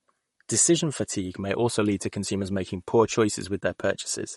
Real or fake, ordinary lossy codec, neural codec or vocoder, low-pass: fake; MP3, 48 kbps; vocoder, 44.1 kHz, 128 mel bands, Pupu-Vocoder; 19.8 kHz